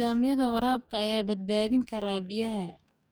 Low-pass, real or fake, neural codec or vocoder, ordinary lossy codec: none; fake; codec, 44.1 kHz, 2.6 kbps, DAC; none